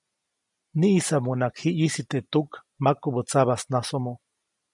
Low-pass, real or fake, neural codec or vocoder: 10.8 kHz; real; none